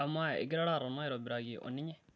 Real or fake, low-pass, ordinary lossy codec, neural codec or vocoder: real; none; none; none